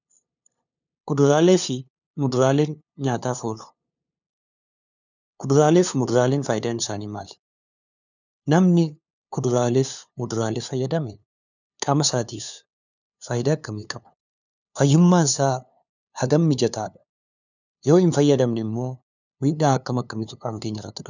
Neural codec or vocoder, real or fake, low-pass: codec, 16 kHz, 2 kbps, FunCodec, trained on LibriTTS, 25 frames a second; fake; 7.2 kHz